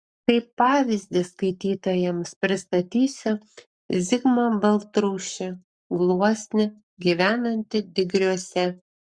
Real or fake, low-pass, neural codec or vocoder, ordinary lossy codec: fake; 9.9 kHz; codec, 44.1 kHz, 7.8 kbps, Pupu-Codec; Opus, 64 kbps